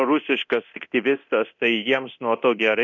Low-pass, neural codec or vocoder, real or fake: 7.2 kHz; codec, 24 kHz, 0.9 kbps, DualCodec; fake